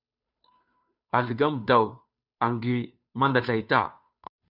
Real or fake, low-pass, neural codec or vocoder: fake; 5.4 kHz; codec, 16 kHz, 2 kbps, FunCodec, trained on Chinese and English, 25 frames a second